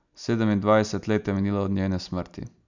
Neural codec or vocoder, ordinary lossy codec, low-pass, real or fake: none; none; 7.2 kHz; real